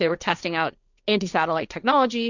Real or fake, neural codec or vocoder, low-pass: fake; codec, 16 kHz, 1.1 kbps, Voila-Tokenizer; 7.2 kHz